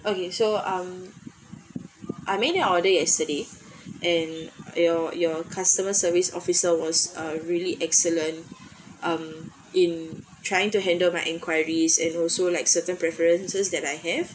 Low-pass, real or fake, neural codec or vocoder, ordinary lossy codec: none; real; none; none